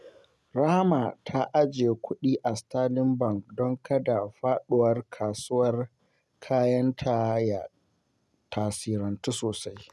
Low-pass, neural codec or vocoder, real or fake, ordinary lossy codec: none; none; real; none